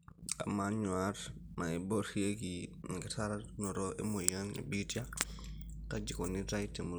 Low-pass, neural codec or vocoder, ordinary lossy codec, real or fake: none; none; none; real